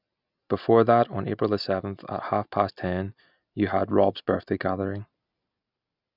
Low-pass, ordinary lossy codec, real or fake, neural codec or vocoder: 5.4 kHz; none; real; none